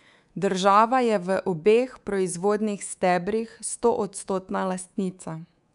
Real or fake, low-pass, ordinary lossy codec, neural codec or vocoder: fake; 10.8 kHz; none; codec, 24 kHz, 3.1 kbps, DualCodec